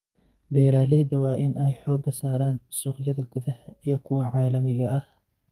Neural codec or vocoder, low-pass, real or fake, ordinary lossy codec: codec, 32 kHz, 1.9 kbps, SNAC; 14.4 kHz; fake; Opus, 32 kbps